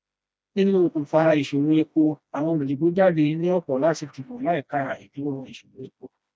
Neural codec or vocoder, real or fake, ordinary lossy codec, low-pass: codec, 16 kHz, 1 kbps, FreqCodec, smaller model; fake; none; none